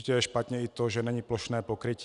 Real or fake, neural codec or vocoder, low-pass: real; none; 10.8 kHz